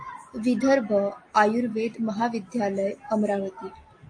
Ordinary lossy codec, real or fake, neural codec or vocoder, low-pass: AAC, 48 kbps; real; none; 9.9 kHz